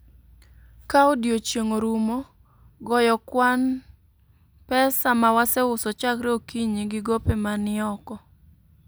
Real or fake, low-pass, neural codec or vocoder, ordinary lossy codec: real; none; none; none